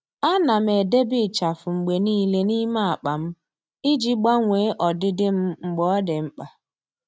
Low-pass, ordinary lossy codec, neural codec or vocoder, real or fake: none; none; none; real